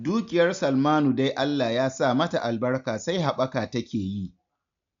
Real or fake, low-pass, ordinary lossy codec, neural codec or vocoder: real; 7.2 kHz; MP3, 64 kbps; none